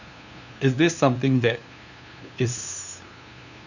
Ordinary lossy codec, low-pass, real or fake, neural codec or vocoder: none; 7.2 kHz; fake; codec, 16 kHz, 2 kbps, FunCodec, trained on LibriTTS, 25 frames a second